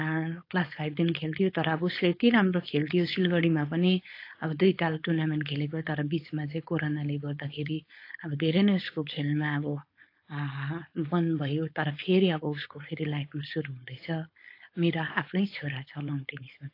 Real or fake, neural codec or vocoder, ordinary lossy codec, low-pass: fake; codec, 16 kHz, 4.8 kbps, FACodec; AAC, 32 kbps; 5.4 kHz